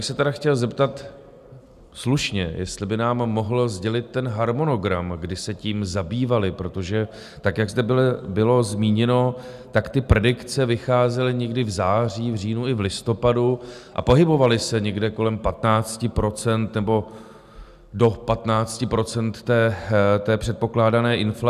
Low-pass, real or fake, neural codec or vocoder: 14.4 kHz; real; none